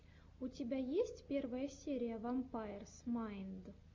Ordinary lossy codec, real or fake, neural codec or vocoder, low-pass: MP3, 48 kbps; real; none; 7.2 kHz